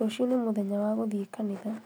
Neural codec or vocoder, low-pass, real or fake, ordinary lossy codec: none; none; real; none